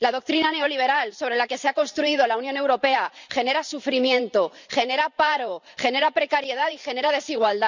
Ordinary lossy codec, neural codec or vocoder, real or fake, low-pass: none; vocoder, 44.1 kHz, 128 mel bands every 512 samples, BigVGAN v2; fake; 7.2 kHz